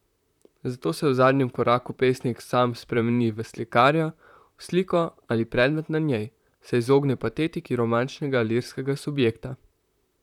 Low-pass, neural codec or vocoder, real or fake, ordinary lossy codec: 19.8 kHz; vocoder, 44.1 kHz, 128 mel bands, Pupu-Vocoder; fake; none